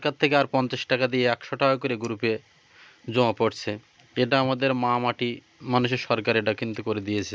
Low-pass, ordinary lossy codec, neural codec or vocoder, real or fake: none; none; none; real